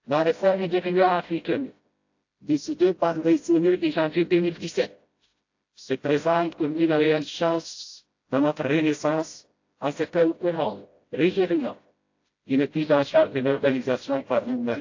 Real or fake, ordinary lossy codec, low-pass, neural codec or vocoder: fake; AAC, 48 kbps; 7.2 kHz; codec, 16 kHz, 0.5 kbps, FreqCodec, smaller model